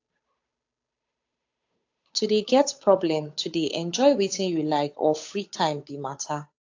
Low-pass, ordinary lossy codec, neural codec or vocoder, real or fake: 7.2 kHz; AAC, 48 kbps; codec, 16 kHz, 8 kbps, FunCodec, trained on Chinese and English, 25 frames a second; fake